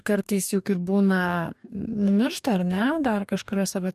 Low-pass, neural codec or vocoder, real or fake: 14.4 kHz; codec, 44.1 kHz, 2.6 kbps, DAC; fake